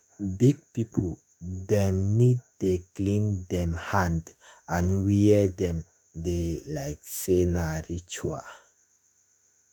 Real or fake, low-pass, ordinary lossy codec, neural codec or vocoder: fake; none; none; autoencoder, 48 kHz, 32 numbers a frame, DAC-VAE, trained on Japanese speech